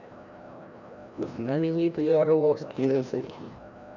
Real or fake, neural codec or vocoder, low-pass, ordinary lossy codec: fake; codec, 16 kHz, 1 kbps, FreqCodec, larger model; 7.2 kHz; none